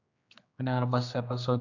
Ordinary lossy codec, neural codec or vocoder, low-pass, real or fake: AAC, 48 kbps; codec, 16 kHz, 2 kbps, X-Codec, HuBERT features, trained on general audio; 7.2 kHz; fake